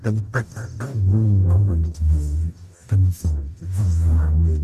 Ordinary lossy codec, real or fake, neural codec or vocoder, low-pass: none; fake; codec, 44.1 kHz, 0.9 kbps, DAC; 14.4 kHz